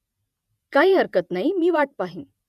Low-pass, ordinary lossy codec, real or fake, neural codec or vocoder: 14.4 kHz; none; fake; vocoder, 48 kHz, 128 mel bands, Vocos